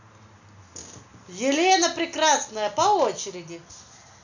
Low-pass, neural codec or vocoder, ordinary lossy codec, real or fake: 7.2 kHz; none; none; real